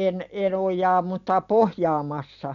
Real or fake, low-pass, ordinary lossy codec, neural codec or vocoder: real; 7.2 kHz; none; none